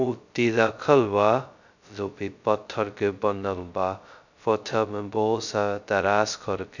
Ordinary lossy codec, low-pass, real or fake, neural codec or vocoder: none; 7.2 kHz; fake; codec, 16 kHz, 0.2 kbps, FocalCodec